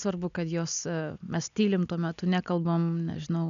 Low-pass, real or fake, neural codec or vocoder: 7.2 kHz; real; none